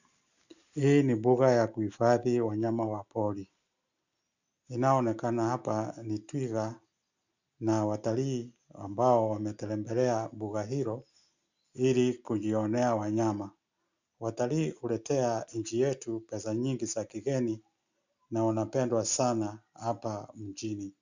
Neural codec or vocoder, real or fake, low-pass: none; real; 7.2 kHz